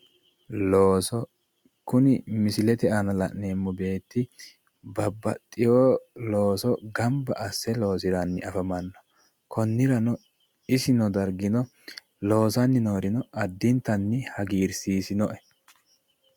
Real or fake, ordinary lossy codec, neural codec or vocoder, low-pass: real; Opus, 64 kbps; none; 19.8 kHz